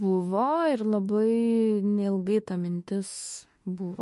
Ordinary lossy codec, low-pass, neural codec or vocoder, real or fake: MP3, 48 kbps; 14.4 kHz; autoencoder, 48 kHz, 32 numbers a frame, DAC-VAE, trained on Japanese speech; fake